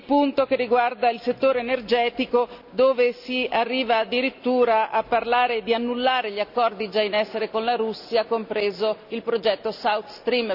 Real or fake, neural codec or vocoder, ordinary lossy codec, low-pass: real; none; none; 5.4 kHz